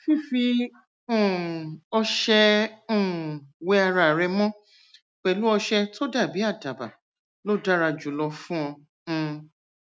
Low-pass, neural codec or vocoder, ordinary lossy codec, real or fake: none; none; none; real